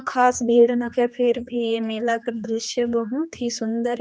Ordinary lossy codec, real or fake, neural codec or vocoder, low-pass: none; fake; codec, 16 kHz, 2 kbps, X-Codec, HuBERT features, trained on general audio; none